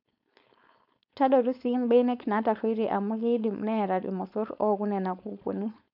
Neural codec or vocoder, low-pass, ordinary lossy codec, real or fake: codec, 16 kHz, 4.8 kbps, FACodec; 5.4 kHz; none; fake